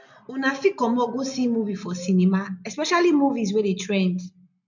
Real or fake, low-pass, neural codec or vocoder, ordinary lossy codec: real; 7.2 kHz; none; none